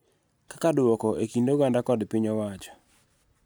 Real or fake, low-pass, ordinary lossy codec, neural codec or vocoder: real; none; none; none